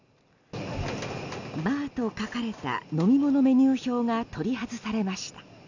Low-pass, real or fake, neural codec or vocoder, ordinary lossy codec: 7.2 kHz; real; none; none